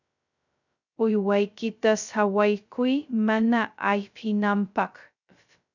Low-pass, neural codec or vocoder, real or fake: 7.2 kHz; codec, 16 kHz, 0.2 kbps, FocalCodec; fake